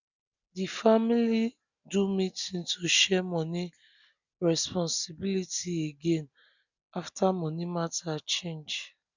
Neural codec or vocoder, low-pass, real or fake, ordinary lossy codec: none; 7.2 kHz; real; none